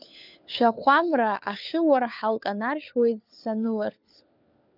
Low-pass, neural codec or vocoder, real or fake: 5.4 kHz; codec, 16 kHz, 4 kbps, FunCodec, trained on LibriTTS, 50 frames a second; fake